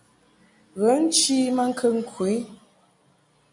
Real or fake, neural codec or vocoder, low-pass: real; none; 10.8 kHz